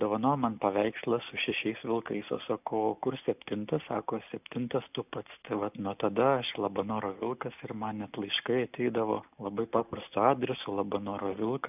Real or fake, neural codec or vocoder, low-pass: real; none; 3.6 kHz